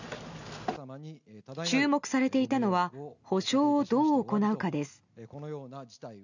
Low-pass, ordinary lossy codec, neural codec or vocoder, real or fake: 7.2 kHz; none; none; real